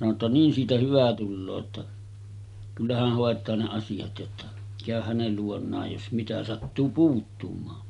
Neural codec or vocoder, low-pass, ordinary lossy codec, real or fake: none; 10.8 kHz; none; real